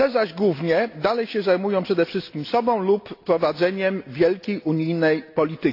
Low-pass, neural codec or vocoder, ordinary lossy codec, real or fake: 5.4 kHz; none; AAC, 32 kbps; real